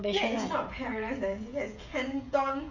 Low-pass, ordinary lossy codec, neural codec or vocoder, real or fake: 7.2 kHz; none; codec, 16 kHz, 16 kbps, FreqCodec, smaller model; fake